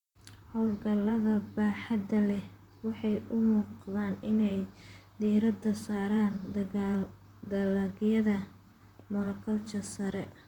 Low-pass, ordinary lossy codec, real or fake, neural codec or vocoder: 19.8 kHz; none; fake; vocoder, 44.1 kHz, 128 mel bands, Pupu-Vocoder